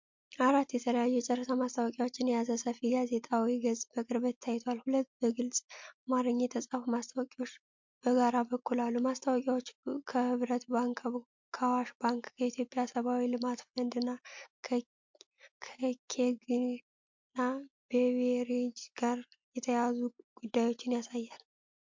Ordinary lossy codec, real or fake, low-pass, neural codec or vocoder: MP3, 48 kbps; real; 7.2 kHz; none